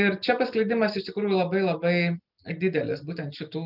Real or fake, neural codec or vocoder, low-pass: real; none; 5.4 kHz